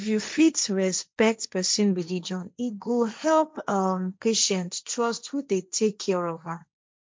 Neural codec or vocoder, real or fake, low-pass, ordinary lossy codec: codec, 16 kHz, 1.1 kbps, Voila-Tokenizer; fake; none; none